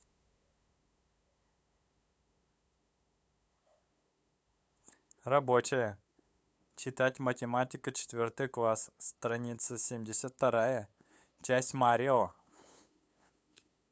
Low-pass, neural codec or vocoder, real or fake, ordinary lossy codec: none; codec, 16 kHz, 8 kbps, FunCodec, trained on LibriTTS, 25 frames a second; fake; none